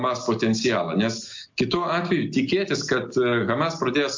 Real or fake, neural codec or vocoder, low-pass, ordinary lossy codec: real; none; 7.2 kHz; MP3, 48 kbps